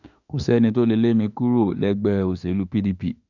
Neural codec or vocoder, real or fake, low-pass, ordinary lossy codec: autoencoder, 48 kHz, 32 numbers a frame, DAC-VAE, trained on Japanese speech; fake; 7.2 kHz; none